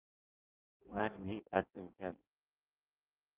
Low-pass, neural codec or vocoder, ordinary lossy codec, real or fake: 3.6 kHz; codec, 16 kHz in and 24 kHz out, 0.6 kbps, FireRedTTS-2 codec; Opus, 32 kbps; fake